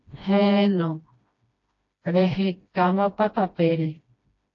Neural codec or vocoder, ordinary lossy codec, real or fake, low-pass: codec, 16 kHz, 1 kbps, FreqCodec, smaller model; AAC, 48 kbps; fake; 7.2 kHz